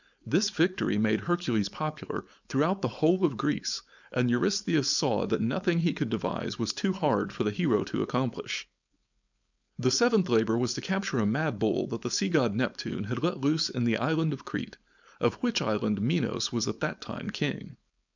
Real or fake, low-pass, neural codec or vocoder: fake; 7.2 kHz; codec, 16 kHz, 4.8 kbps, FACodec